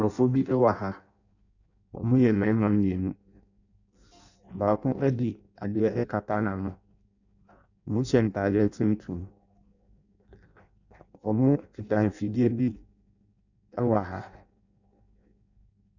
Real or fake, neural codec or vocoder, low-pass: fake; codec, 16 kHz in and 24 kHz out, 0.6 kbps, FireRedTTS-2 codec; 7.2 kHz